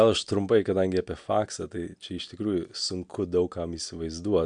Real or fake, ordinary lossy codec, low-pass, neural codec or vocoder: real; MP3, 96 kbps; 9.9 kHz; none